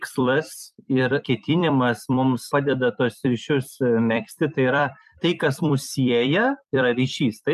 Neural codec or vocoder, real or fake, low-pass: vocoder, 44.1 kHz, 128 mel bands every 256 samples, BigVGAN v2; fake; 14.4 kHz